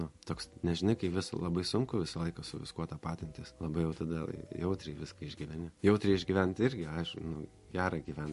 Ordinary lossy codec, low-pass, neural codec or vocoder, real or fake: MP3, 48 kbps; 14.4 kHz; none; real